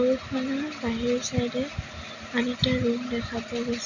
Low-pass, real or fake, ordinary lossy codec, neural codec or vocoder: 7.2 kHz; real; none; none